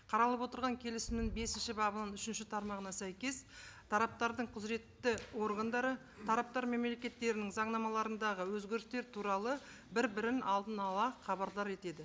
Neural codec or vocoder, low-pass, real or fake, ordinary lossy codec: none; none; real; none